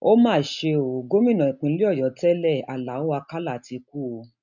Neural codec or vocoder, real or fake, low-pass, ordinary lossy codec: none; real; 7.2 kHz; none